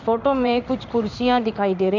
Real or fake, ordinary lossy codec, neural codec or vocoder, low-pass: fake; none; codec, 16 kHz in and 24 kHz out, 1 kbps, XY-Tokenizer; 7.2 kHz